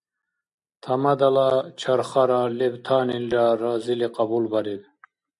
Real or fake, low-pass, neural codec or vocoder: real; 10.8 kHz; none